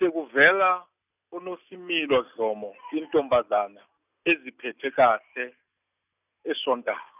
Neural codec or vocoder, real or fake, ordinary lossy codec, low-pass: none; real; none; 3.6 kHz